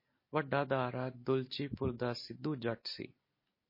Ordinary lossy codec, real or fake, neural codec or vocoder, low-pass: MP3, 24 kbps; real; none; 5.4 kHz